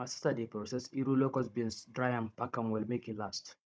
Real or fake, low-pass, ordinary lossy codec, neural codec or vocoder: fake; none; none; codec, 16 kHz, 16 kbps, FunCodec, trained on Chinese and English, 50 frames a second